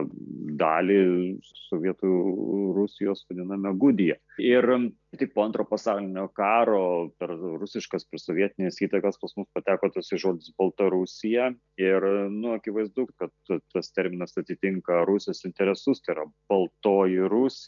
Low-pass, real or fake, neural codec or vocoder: 7.2 kHz; real; none